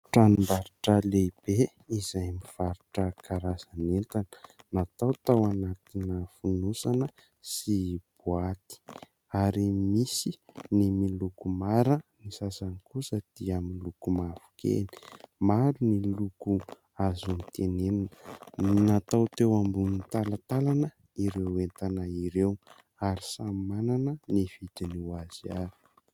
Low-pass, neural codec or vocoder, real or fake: 19.8 kHz; none; real